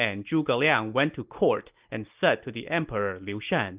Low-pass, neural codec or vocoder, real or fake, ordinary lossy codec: 3.6 kHz; none; real; Opus, 24 kbps